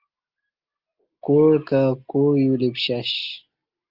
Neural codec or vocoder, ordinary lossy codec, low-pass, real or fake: none; Opus, 32 kbps; 5.4 kHz; real